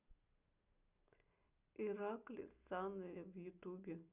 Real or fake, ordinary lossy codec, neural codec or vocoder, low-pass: real; none; none; 3.6 kHz